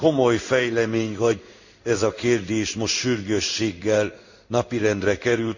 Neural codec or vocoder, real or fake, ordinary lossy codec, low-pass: codec, 16 kHz in and 24 kHz out, 1 kbps, XY-Tokenizer; fake; none; 7.2 kHz